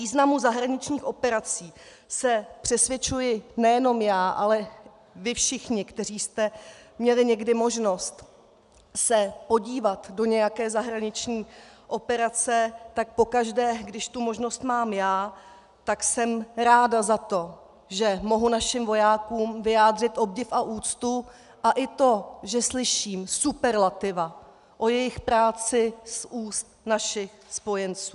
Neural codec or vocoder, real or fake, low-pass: none; real; 10.8 kHz